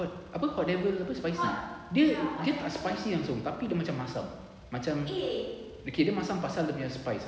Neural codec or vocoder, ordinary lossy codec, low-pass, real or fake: none; none; none; real